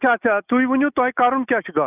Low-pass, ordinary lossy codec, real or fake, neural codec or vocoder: 3.6 kHz; none; real; none